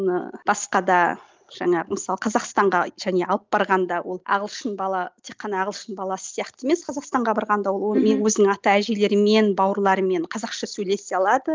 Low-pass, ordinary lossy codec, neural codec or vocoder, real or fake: 7.2 kHz; Opus, 24 kbps; none; real